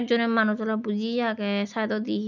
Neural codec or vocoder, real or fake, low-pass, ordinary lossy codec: none; real; 7.2 kHz; none